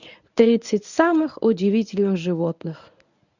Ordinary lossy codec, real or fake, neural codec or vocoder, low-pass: none; fake; codec, 24 kHz, 0.9 kbps, WavTokenizer, medium speech release version 1; 7.2 kHz